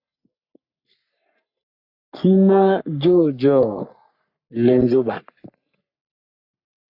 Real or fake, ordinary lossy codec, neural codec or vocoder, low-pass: fake; AAC, 48 kbps; codec, 44.1 kHz, 3.4 kbps, Pupu-Codec; 5.4 kHz